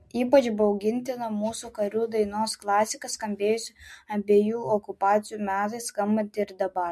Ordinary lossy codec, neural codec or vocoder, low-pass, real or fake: MP3, 64 kbps; none; 14.4 kHz; real